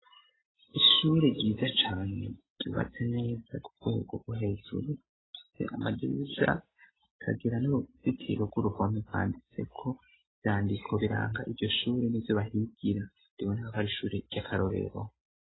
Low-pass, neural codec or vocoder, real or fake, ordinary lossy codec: 7.2 kHz; none; real; AAC, 16 kbps